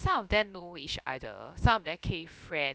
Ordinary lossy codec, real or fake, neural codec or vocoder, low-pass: none; fake; codec, 16 kHz, about 1 kbps, DyCAST, with the encoder's durations; none